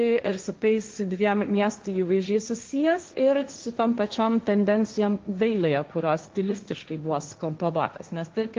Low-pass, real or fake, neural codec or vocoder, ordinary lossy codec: 7.2 kHz; fake; codec, 16 kHz, 1.1 kbps, Voila-Tokenizer; Opus, 32 kbps